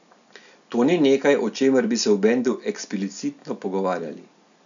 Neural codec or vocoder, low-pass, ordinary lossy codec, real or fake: none; 7.2 kHz; none; real